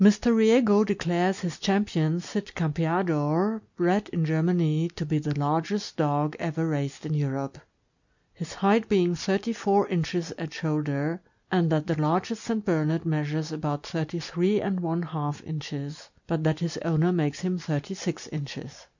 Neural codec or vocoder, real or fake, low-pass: none; real; 7.2 kHz